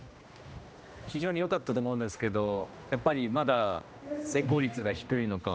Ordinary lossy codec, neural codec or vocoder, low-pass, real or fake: none; codec, 16 kHz, 1 kbps, X-Codec, HuBERT features, trained on general audio; none; fake